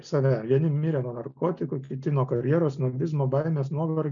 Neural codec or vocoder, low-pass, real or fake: none; 7.2 kHz; real